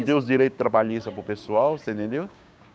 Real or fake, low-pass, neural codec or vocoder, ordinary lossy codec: fake; none; codec, 16 kHz, 6 kbps, DAC; none